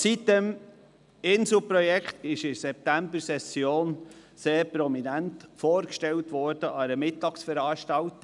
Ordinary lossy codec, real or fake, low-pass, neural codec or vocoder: none; real; 10.8 kHz; none